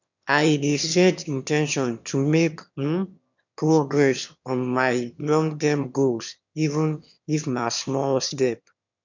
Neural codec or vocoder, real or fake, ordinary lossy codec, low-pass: autoencoder, 22.05 kHz, a latent of 192 numbers a frame, VITS, trained on one speaker; fake; none; 7.2 kHz